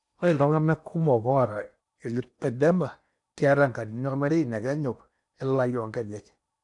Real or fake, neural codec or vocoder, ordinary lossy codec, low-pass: fake; codec, 16 kHz in and 24 kHz out, 0.8 kbps, FocalCodec, streaming, 65536 codes; none; 10.8 kHz